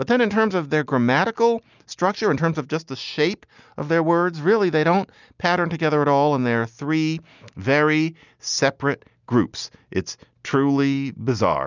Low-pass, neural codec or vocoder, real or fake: 7.2 kHz; none; real